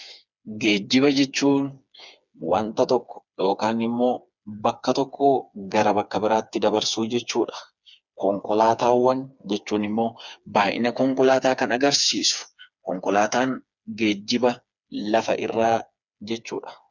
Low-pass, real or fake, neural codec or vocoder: 7.2 kHz; fake; codec, 16 kHz, 4 kbps, FreqCodec, smaller model